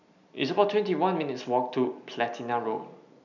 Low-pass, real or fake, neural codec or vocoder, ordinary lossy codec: 7.2 kHz; real; none; none